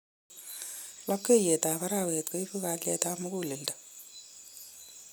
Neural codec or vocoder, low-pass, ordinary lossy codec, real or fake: none; none; none; real